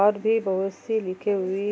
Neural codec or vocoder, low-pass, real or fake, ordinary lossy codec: none; none; real; none